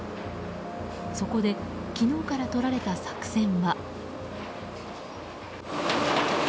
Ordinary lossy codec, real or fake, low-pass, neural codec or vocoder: none; real; none; none